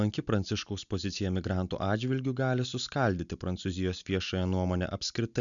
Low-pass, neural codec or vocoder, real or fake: 7.2 kHz; none; real